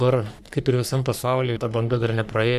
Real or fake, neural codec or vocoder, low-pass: fake; codec, 44.1 kHz, 3.4 kbps, Pupu-Codec; 14.4 kHz